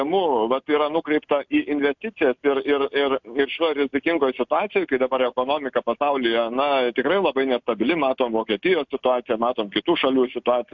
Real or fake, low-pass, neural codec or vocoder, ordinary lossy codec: real; 7.2 kHz; none; MP3, 64 kbps